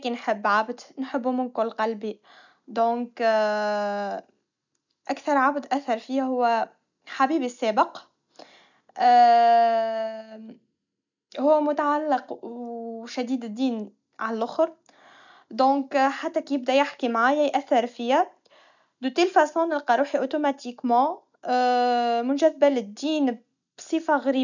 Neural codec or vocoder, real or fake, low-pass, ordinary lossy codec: none; real; 7.2 kHz; none